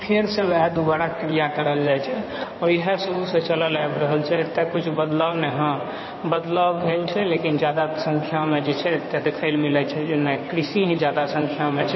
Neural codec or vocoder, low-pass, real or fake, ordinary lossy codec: codec, 16 kHz in and 24 kHz out, 2.2 kbps, FireRedTTS-2 codec; 7.2 kHz; fake; MP3, 24 kbps